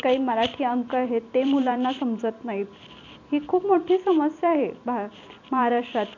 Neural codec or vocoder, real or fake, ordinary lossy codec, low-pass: vocoder, 44.1 kHz, 128 mel bands every 256 samples, BigVGAN v2; fake; none; 7.2 kHz